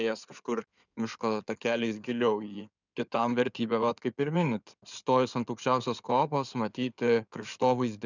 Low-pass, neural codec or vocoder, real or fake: 7.2 kHz; codec, 16 kHz in and 24 kHz out, 2.2 kbps, FireRedTTS-2 codec; fake